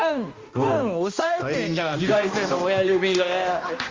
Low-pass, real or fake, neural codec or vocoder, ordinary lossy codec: 7.2 kHz; fake; codec, 16 kHz, 1 kbps, X-Codec, HuBERT features, trained on balanced general audio; Opus, 32 kbps